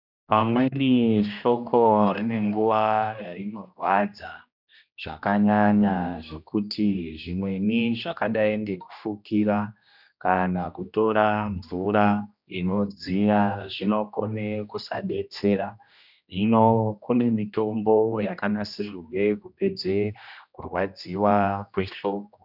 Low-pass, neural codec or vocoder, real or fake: 5.4 kHz; codec, 16 kHz, 1 kbps, X-Codec, HuBERT features, trained on general audio; fake